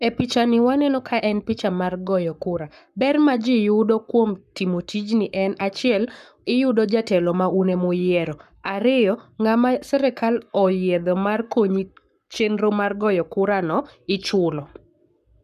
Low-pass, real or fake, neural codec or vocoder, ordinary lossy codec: 14.4 kHz; fake; codec, 44.1 kHz, 7.8 kbps, Pupu-Codec; none